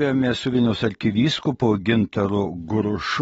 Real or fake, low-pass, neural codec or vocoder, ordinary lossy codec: real; 19.8 kHz; none; AAC, 24 kbps